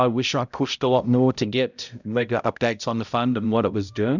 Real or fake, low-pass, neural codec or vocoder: fake; 7.2 kHz; codec, 16 kHz, 0.5 kbps, X-Codec, HuBERT features, trained on balanced general audio